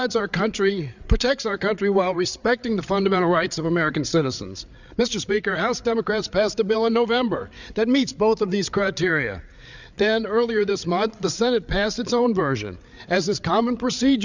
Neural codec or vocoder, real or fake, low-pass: codec, 16 kHz, 8 kbps, FreqCodec, larger model; fake; 7.2 kHz